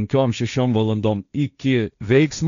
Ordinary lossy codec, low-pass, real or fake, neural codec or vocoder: MP3, 96 kbps; 7.2 kHz; fake; codec, 16 kHz, 1.1 kbps, Voila-Tokenizer